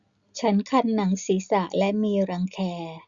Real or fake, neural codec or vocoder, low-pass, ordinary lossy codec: real; none; 7.2 kHz; Opus, 64 kbps